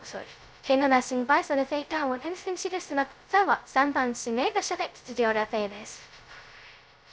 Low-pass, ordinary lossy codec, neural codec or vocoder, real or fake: none; none; codec, 16 kHz, 0.2 kbps, FocalCodec; fake